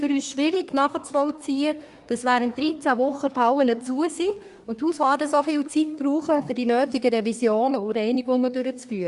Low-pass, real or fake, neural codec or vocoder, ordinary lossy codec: 10.8 kHz; fake; codec, 24 kHz, 1 kbps, SNAC; Opus, 64 kbps